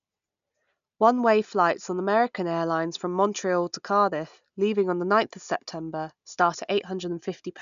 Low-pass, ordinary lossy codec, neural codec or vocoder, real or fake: 7.2 kHz; none; none; real